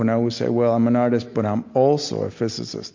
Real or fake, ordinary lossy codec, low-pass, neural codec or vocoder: real; MP3, 48 kbps; 7.2 kHz; none